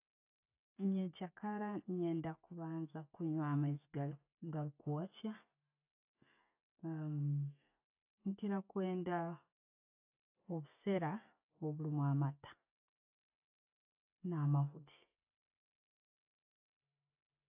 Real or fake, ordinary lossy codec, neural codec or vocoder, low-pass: real; AAC, 32 kbps; none; 3.6 kHz